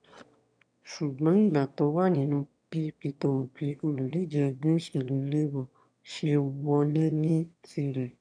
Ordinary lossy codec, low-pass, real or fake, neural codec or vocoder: none; 9.9 kHz; fake; autoencoder, 22.05 kHz, a latent of 192 numbers a frame, VITS, trained on one speaker